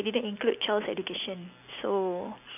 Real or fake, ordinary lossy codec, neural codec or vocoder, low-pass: real; none; none; 3.6 kHz